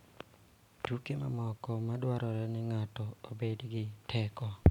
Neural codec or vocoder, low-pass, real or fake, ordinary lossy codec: none; 19.8 kHz; real; none